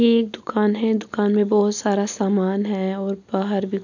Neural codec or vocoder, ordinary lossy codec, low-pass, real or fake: none; none; 7.2 kHz; real